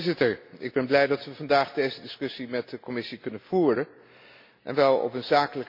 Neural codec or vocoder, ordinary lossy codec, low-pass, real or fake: none; MP3, 32 kbps; 5.4 kHz; real